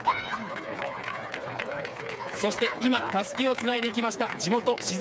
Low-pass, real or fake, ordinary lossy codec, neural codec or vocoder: none; fake; none; codec, 16 kHz, 4 kbps, FreqCodec, smaller model